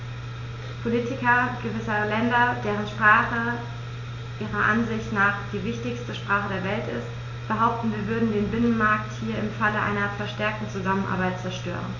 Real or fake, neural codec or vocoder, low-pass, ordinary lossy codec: real; none; 7.2 kHz; AAC, 48 kbps